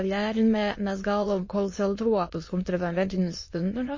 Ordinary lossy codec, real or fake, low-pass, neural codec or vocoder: MP3, 32 kbps; fake; 7.2 kHz; autoencoder, 22.05 kHz, a latent of 192 numbers a frame, VITS, trained on many speakers